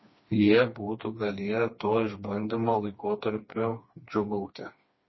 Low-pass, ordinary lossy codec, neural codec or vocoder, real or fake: 7.2 kHz; MP3, 24 kbps; codec, 16 kHz, 2 kbps, FreqCodec, smaller model; fake